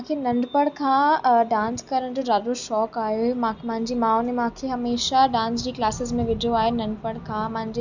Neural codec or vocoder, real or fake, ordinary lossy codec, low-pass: none; real; none; 7.2 kHz